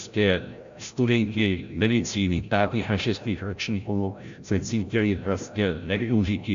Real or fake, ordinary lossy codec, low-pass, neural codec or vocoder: fake; AAC, 64 kbps; 7.2 kHz; codec, 16 kHz, 0.5 kbps, FreqCodec, larger model